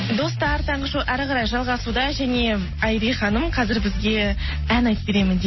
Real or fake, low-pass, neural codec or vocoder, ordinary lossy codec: real; 7.2 kHz; none; MP3, 24 kbps